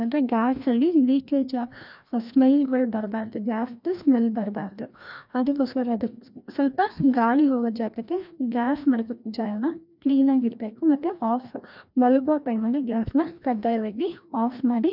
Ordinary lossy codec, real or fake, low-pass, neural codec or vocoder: none; fake; 5.4 kHz; codec, 16 kHz, 1 kbps, FreqCodec, larger model